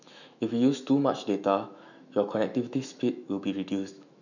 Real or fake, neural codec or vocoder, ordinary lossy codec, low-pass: fake; autoencoder, 48 kHz, 128 numbers a frame, DAC-VAE, trained on Japanese speech; none; 7.2 kHz